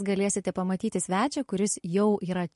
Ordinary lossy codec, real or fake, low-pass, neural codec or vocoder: MP3, 48 kbps; real; 14.4 kHz; none